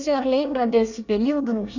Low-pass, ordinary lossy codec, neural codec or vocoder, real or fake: 7.2 kHz; none; codec, 24 kHz, 1 kbps, SNAC; fake